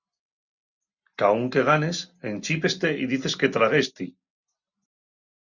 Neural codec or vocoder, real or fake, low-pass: none; real; 7.2 kHz